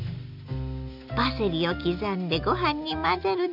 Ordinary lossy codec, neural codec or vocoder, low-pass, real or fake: none; none; 5.4 kHz; real